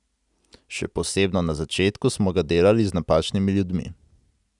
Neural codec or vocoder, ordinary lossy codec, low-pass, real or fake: none; none; 10.8 kHz; real